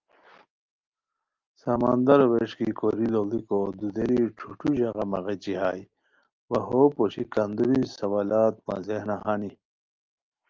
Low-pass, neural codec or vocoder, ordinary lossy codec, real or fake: 7.2 kHz; none; Opus, 24 kbps; real